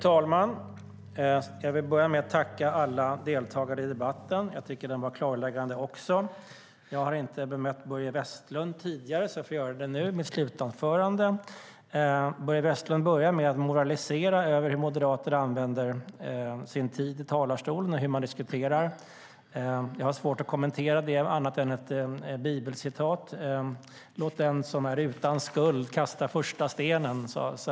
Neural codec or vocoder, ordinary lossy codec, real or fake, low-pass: none; none; real; none